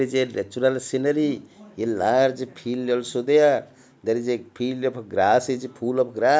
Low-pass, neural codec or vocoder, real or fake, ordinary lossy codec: none; none; real; none